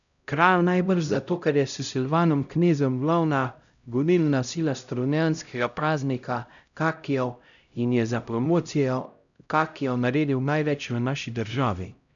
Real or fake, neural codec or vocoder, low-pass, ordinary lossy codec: fake; codec, 16 kHz, 0.5 kbps, X-Codec, HuBERT features, trained on LibriSpeech; 7.2 kHz; none